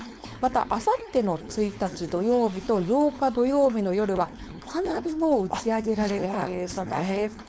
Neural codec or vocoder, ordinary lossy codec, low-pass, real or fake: codec, 16 kHz, 4.8 kbps, FACodec; none; none; fake